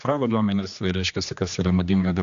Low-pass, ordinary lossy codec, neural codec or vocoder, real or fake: 7.2 kHz; AAC, 96 kbps; codec, 16 kHz, 2 kbps, X-Codec, HuBERT features, trained on general audio; fake